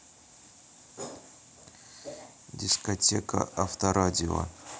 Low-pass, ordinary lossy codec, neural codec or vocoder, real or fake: none; none; none; real